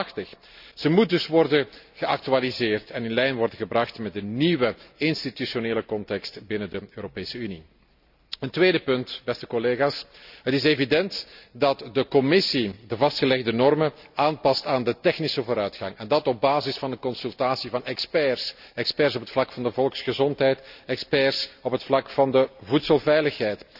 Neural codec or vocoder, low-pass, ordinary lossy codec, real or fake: none; 5.4 kHz; none; real